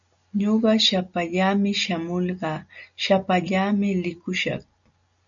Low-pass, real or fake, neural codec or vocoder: 7.2 kHz; real; none